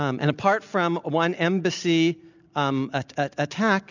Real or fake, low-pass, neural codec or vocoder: real; 7.2 kHz; none